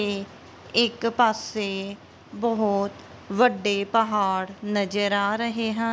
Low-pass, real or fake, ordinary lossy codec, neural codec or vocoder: none; real; none; none